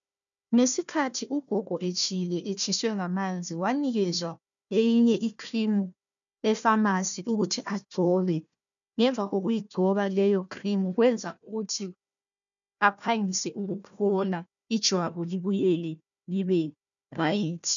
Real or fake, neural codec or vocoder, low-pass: fake; codec, 16 kHz, 1 kbps, FunCodec, trained on Chinese and English, 50 frames a second; 7.2 kHz